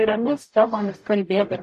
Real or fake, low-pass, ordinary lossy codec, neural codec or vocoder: fake; 14.4 kHz; MP3, 64 kbps; codec, 44.1 kHz, 0.9 kbps, DAC